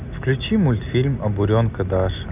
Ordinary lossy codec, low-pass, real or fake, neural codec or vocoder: none; 3.6 kHz; real; none